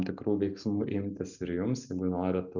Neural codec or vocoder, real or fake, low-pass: none; real; 7.2 kHz